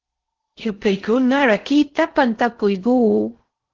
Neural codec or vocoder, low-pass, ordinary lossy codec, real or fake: codec, 16 kHz in and 24 kHz out, 0.6 kbps, FocalCodec, streaming, 4096 codes; 7.2 kHz; Opus, 16 kbps; fake